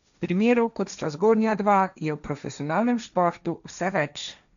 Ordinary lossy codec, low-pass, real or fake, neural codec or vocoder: none; 7.2 kHz; fake; codec, 16 kHz, 1.1 kbps, Voila-Tokenizer